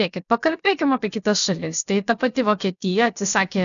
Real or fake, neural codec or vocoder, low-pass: fake; codec, 16 kHz, about 1 kbps, DyCAST, with the encoder's durations; 7.2 kHz